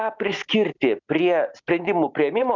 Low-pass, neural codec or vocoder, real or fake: 7.2 kHz; none; real